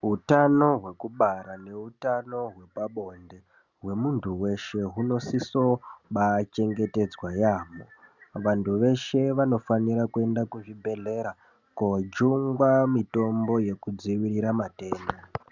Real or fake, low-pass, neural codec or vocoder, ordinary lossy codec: real; 7.2 kHz; none; Opus, 64 kbps